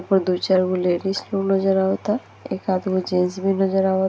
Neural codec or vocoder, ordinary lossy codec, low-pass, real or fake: none; none; none; real